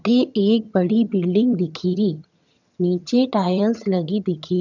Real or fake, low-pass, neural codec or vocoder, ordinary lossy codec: fake; 7.2 kHz; vocoder, 22.05 kHz, 80 mel bands, HiFi-GAN; none